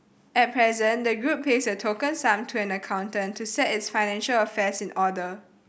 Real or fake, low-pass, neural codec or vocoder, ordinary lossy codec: real; none; none; none